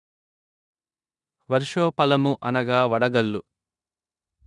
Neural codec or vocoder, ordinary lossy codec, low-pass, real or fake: codec, 16 kHz in and 24 kHz out, 0.9 kbps, LongCat-Audio-Codec, four codebook decoder; none; 10.8 kHz; fake